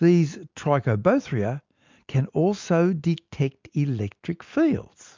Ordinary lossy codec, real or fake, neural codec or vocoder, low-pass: MP3, 64 kbps; real; none; 7.2 kHz